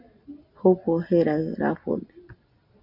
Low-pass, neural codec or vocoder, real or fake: 5.4 kHz; none; real